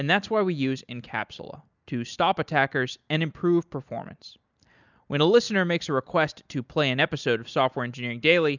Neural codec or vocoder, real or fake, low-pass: none; real; 7.2 kHz